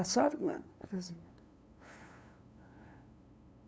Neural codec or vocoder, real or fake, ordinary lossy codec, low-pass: codec, 16 kHz, 2 kbps, FunCodec, trained on LibriTTS, 25 frames a second; fake; none; none